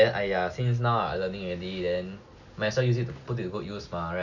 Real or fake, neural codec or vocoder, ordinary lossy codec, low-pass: real; none; none; 7.2 kHz